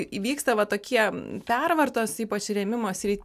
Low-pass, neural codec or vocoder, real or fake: 14.4 kHz; none; real